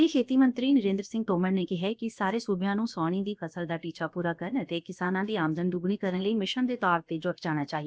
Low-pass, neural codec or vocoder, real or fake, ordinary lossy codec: none; codec, 16 kHz, about 1 kbps, DyCAST, with the encoder's durations; fake; none